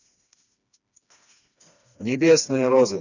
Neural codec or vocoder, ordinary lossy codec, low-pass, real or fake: codec, 16 kHz, 2 kbps, FreqCodec, smaller model; none; 7.2 kHz; fake